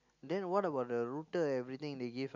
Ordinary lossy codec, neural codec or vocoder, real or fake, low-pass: none; none; real; 7.2 kHz